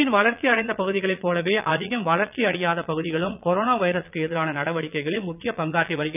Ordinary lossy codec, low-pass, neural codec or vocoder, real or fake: none; 3.6 kHz; vocoder, 22.05 kHz, 80 mel bands, Vocos; fake